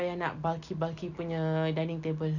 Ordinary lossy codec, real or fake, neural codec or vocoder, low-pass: none; real; none; 7.2 kHz